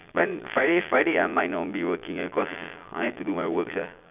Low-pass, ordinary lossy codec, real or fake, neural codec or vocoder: 3.6 kHz; none; fake; vocoder, 22.05 kHz, 80 mel bands, Vocos